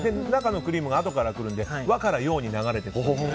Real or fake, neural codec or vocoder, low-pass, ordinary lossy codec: real; none; none; none